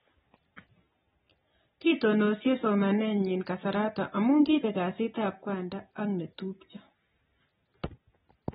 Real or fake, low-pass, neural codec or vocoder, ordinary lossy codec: real; 19.8 kHz; none; AAC, 16 kbps